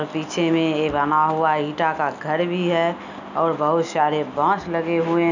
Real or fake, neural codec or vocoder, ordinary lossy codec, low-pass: real; none; none; 7.2 kHz